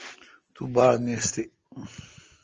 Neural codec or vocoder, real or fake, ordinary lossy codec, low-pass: none; real; Opus, 24 kbps; 7.2 kHz